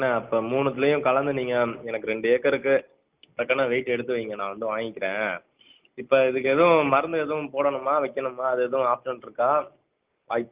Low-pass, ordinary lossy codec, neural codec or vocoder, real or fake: 3.6 kHz; Opus, 24 kbps; none; real